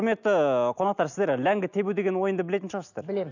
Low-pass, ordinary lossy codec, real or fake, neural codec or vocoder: 7.2 kHz; none; real; none